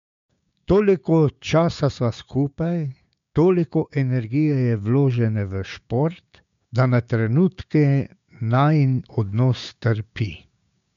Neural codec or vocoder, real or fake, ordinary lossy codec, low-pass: codec, 16 kHz, 6 kbps, DAC; fake; MP3, 64 kbps; 7.2 kHz